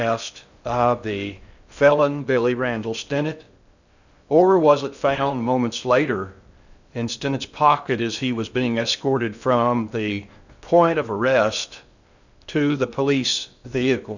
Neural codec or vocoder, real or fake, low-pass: codec, 16 kHz in and 24 kHz out, 0.6 kbps, FocalCodec, streaming, 2048 codes; fake; 7.2 kHz